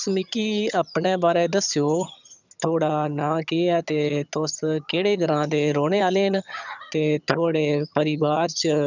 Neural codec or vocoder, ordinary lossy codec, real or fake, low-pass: vocoder, 22.05 kHz, 80 mel bands, HiFi-GAN; none; fake; 7.2 kHz